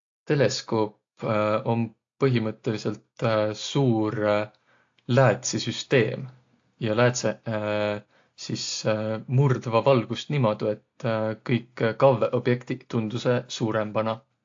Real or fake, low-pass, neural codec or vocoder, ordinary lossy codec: real; 7.2 kHz; none; AAC, 48 kbps